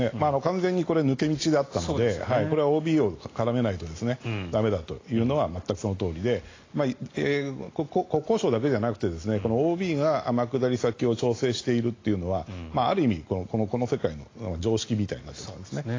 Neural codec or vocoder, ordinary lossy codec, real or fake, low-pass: none; AAC, 32 kbps; real; 7.2 kHz